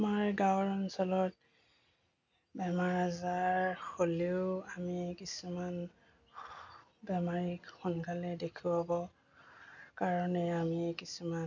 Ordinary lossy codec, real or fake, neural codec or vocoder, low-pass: none; real; none; 7.2 kHz